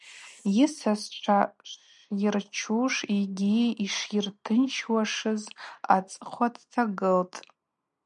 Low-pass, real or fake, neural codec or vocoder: 10.8 kHz; real; none